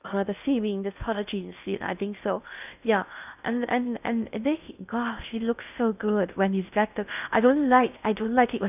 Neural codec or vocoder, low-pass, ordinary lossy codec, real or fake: codec, 16 kHz in and 24 kHz out, 0.6 kbps, FocalCodec, streaming, 2048 codes; 3.6 kHz; none; fake